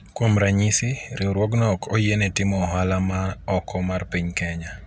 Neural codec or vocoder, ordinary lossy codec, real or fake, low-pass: none; none; real; none